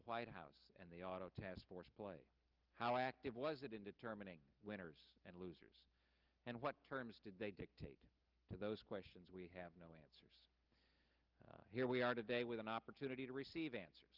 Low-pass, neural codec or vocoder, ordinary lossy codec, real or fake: 5.4 kHz; none; Opus, 32 kbps; real